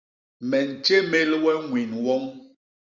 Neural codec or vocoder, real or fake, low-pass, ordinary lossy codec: none; real; 7.2 kHz; Opus, 64 kbps